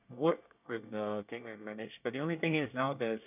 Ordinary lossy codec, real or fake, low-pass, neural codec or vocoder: none; fake; 3.6 kHz; codec, 24 kHz, 1 kbps, SNAC